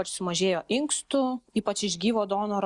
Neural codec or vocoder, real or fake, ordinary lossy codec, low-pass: none; real; Opus, 64 kbps; 10.8 kHz